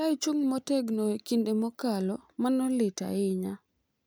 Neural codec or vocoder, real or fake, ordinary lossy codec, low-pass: none; real; none; none